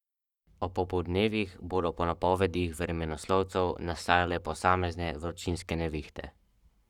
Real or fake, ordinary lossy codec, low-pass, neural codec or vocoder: fake; none; 19.8 kHz; codec, 44.1 kHz, 7.8 kbps, Pupu-Codec